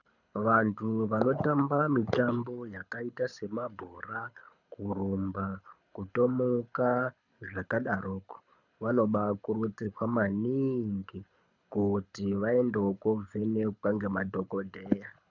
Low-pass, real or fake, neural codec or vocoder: 7.2 kHz; fake; codec, 24 kHz, 6 kbps, HILCodec